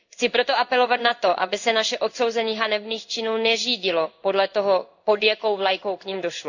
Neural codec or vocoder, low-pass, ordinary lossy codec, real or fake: codec, 16 kHz in and 24 kHz out, 1 kbps, XY-Tokenizer; 7.2 kHz; none; fake